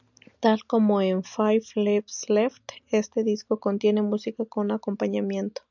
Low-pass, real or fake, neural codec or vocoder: 7.2 kHz; real; none